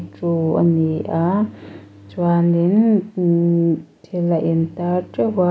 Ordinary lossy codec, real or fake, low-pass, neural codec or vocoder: none; real; none; none